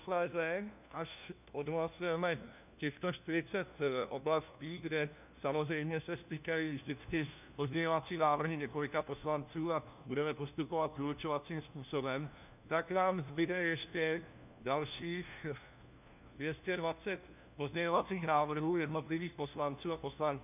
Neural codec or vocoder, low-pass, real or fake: codec, 16 kHz, 1 kbps, FunCodec, trained on LibriTTS, 50 frames a second; 3.6 kHz; fake